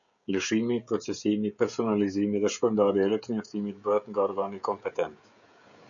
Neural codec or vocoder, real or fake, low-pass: codec, 16 kHz, 16 kbps, FreqCodec, smaller model; fake; 7.2 kHz